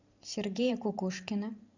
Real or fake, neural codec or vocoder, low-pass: fake; vocoder, 44.1 kHz, 128 mel bands every 512 samples, BigVGAN v2; 7.2 kHz